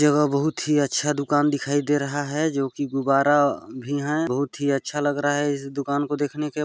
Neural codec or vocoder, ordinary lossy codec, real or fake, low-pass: none; none; real; none